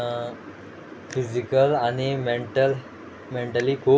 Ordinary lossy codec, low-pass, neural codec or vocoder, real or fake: none; none; none; real